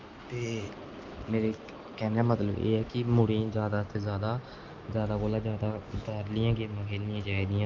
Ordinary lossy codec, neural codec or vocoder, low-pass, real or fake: Opus, 32 kbps; none; 7.2 kHz; real